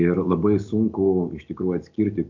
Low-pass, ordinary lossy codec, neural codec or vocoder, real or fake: 7.2 kHz; MP3, 48 kbps; none; real